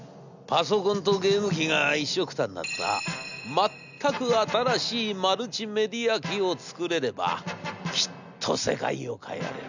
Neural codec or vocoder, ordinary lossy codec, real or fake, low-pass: none; none; real; 7.2 kHz